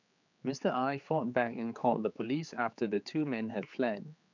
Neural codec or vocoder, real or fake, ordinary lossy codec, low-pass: codec, 16 kHz, 4 kbps, X-Codec, HuBERT features, trained on general audio; fake; none; 7.2 kHz